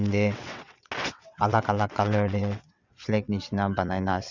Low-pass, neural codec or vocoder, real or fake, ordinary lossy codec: 7.2 kHz; vocoder, 22.05 kHz, 80 mel bands, WaveNeXt; fake; none